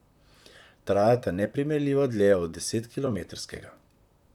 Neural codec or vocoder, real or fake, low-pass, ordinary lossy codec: vocoder, 44.1 kHz, 128 mel bands, Pupu-Vocoder; fake; 19.8 kHz; none